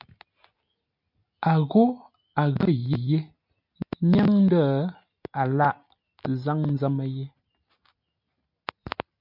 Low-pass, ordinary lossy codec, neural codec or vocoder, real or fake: 5.4 kHz; MP3, 48 kbps; none; real